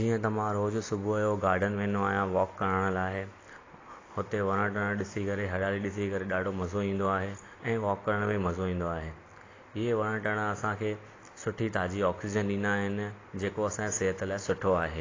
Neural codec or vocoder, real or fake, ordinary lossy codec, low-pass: none; real; AAC, 32 kbps; 7.2 kHz